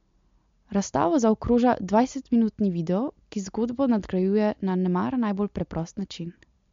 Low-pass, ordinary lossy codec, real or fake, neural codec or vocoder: 7.2 kHz; MP3, 48 kbps; real; none